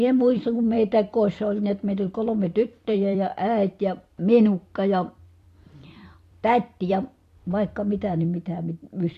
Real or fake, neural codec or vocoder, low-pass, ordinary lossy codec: fake; vocoder, 44.1 kHz, 128 mel bands every 256 samples, BigVGAN v2; 14.4 kHz; AAC, 64 kbps